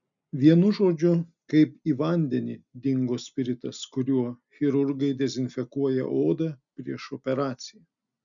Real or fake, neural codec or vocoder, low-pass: real; none; 7.2 kHz